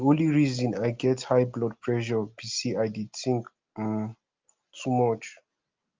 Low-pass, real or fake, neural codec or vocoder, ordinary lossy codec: 7.2 kHz; real; none; Opus, 24 kbps